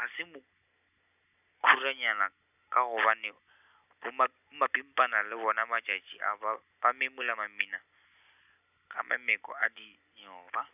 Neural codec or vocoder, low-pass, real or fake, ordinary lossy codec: none; 3.6 kHz; real; none